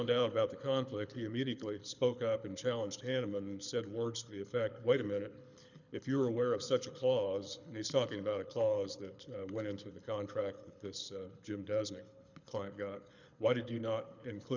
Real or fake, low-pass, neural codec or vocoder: fake; 7.2 kHz; codec, 24 kHz, 6 kbps, HILCodec